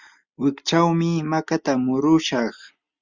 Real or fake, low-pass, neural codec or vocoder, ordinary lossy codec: real; 7.2 kHz; none; Opus, 64 kbps